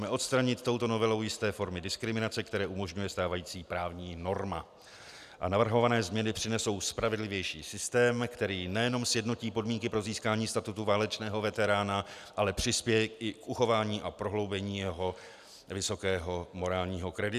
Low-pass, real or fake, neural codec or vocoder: 14.4 kHz; real; none